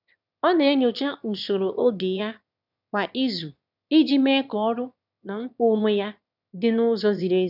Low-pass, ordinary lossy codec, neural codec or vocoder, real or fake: 5.4 kHz; none; autoencoder, 22.05 kHz, a latent of 192 numbers a frame, VITS, trained on one speaker; fake